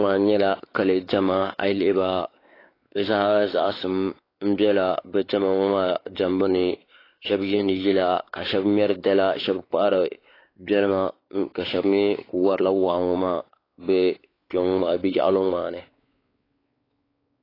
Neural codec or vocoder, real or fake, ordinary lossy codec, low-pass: codec, 16 kHz, 4 kbps, X-Codec, WavLM features, trained on Multilingual LibriSpeech; fake; AAC, 24 kbps; 5.4 kHz